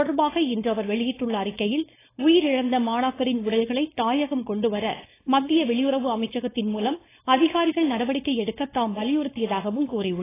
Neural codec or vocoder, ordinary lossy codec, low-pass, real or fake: codec, 16 kHz, 4.8 kbps, FACodec; AAC, 16 kbps; 3.6 kHz; fake